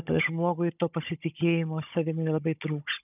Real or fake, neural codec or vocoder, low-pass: fake; codec, 16 kHz, 16 kbps, FunCodec, trained on Chinese and English, 50 frames a second; 3.6 kHz